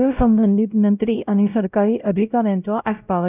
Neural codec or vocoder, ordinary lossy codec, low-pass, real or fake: codec, 16 kHz, 0.5 kbps, X-Codec, WavLM features, trained on Multilingual LibriSpeech; none; 3.6 kHz; fake